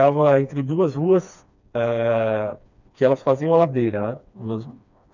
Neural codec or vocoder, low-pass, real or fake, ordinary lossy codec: codec, 16 kHz, 2 kbps, FreqCodec, smaller model; 7.2 kHz; fake; none